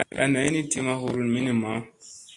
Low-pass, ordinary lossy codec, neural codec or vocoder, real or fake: 10.8 kHz; Opus, 64 kbps; none; real